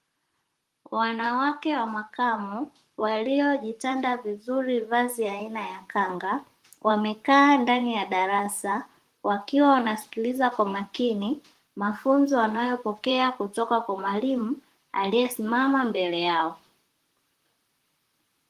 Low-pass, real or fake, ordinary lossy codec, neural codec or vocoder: 14.4 kHz; fake; Opus, 24 kbps; vocoder, 44.1 kHz, 128 mel bands, Pupu-Vocoder